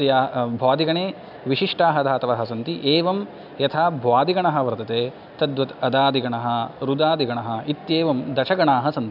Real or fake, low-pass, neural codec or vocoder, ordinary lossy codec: real; 5.4 kHz; none; none